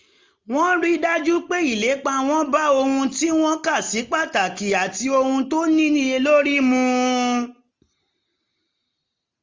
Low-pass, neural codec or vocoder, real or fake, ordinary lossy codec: 7.2 kHz; none; real; Opus, 32 kbps